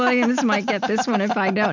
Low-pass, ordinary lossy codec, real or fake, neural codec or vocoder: 7.2 kHz; MP3, 64 kbps; real; none